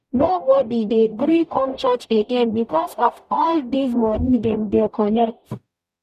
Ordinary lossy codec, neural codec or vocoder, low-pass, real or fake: none; codec, 44.1 kHz, 0.9 kbps, DAC; 14.4 kHz; fake